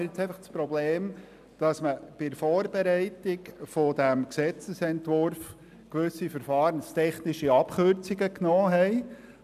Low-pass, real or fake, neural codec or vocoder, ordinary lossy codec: 14.4 kHz; real; none; none